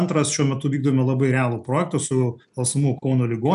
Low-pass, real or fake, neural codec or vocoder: 10.8 kHz; real; none